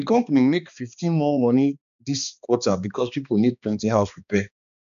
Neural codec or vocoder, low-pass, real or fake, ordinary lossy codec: codec, 16 kHz, 2 kbps, X-Codec, HuBERT features, trained on balanced general audio; 7.2 kHz; fake; none